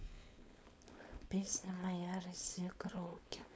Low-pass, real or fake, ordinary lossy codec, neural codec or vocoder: none; fake; none; codec, 16 kHz, 4.8 kbps, FACodec